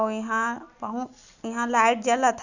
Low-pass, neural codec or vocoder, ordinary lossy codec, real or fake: 7.2 kHz; none; none; real